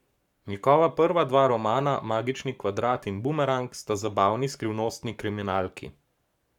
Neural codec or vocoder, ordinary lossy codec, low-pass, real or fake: codec, 44.1 kHz, 7.8 kbps, Pupu-Codec; none; 19.8 kHz; fake